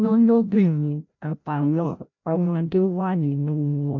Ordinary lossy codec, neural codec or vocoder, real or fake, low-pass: none; codec, 16 kHz, 0.5 kbps, FreqCodec, larger model; fake; 7.2 kHz